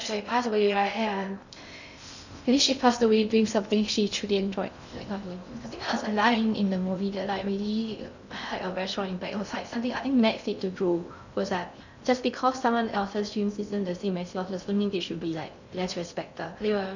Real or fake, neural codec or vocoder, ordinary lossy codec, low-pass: fake; codec, 16 kHz in and 24 kHz out, 0.6 kbps, FocalCodec, streaming, 2048 codes; none; 7.2 kHz